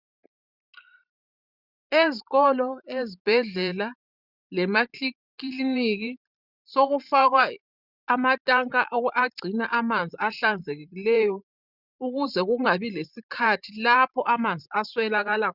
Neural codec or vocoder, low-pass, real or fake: vocoder, 44.1 kHz, 128 mel bands every 512 samples, BigVGAN v2; 5.4 kHz; fake